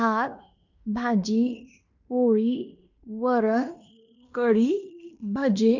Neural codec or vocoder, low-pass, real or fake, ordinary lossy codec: codec, 16 kHz in and 24 kHz out, 0.9 kbps, LongCat-Audio-Codec, fine tuned four codebook decoder; 7.2 kHz; fake; none